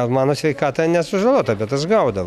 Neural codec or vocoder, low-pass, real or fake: none; 19.8 kHz; real